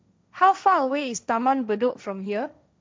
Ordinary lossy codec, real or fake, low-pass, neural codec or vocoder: none; fake; none; codec, 16 kHz, 1.1 kbps, Voila-Tokenizer